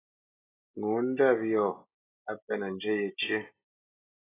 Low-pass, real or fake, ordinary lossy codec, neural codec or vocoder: 3.6 kHz; real; AAC, 16 kbps; none